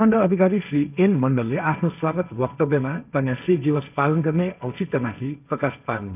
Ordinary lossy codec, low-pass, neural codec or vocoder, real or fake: none; 3.6 kHz; codec, 16 kHz, 1.1 kbps, Voila-Tokenizer; fake